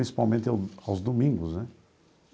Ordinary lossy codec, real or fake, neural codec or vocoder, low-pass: none; real; none; none